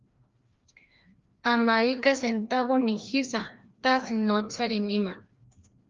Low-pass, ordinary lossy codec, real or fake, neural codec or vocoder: 7.2 kHz; Opus, 32 kbps; fake; codec, 16 kHz, 1 kbps, FreqCodec, larger model